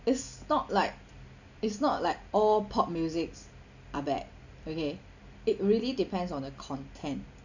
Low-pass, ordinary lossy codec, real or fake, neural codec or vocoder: 7.2 kHz; none; real; none